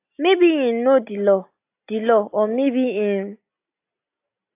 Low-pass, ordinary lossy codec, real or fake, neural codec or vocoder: 3.6 kHz; AAC, 24 kbps; real; none